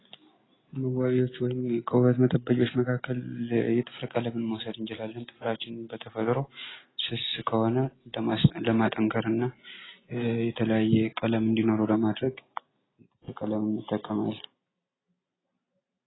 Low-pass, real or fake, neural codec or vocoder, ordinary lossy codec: 7.2 kHz; real; none; AAC, 16 kbps